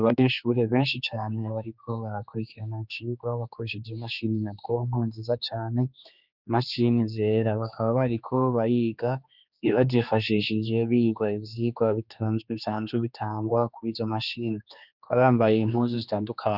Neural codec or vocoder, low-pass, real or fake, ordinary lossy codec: codec, 16 kHz, 2 kbps, X-Codec, HuBERT features, trained on general audio; 5.4 kHz; fake; Opus, 64 kbps